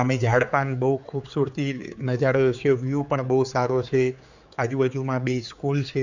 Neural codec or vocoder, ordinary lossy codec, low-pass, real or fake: codec, 16 kHz, 4 kbps, X-Codec, HuBERT features, trained on general audio; none; 7.2 kHz; fake